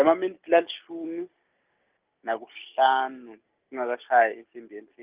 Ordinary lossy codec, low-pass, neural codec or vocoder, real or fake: Opus, 24 kbps; 3.6 kHz; none; real